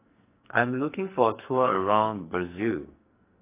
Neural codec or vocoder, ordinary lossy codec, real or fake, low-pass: codec, 44.1 kHz, 2.6 kbps, SNAC; AAC, 24 kbps; fake; 3.6 kHz